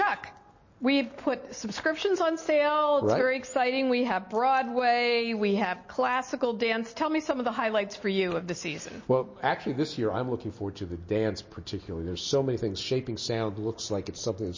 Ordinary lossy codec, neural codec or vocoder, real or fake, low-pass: MP3, 32 kbps; none; real; 7.2 kHz